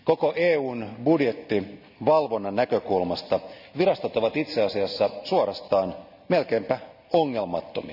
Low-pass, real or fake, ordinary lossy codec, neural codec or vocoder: 5.4 kHz; real; none; none